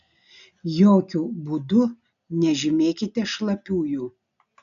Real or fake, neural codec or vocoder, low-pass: real; none; 7.2 kHz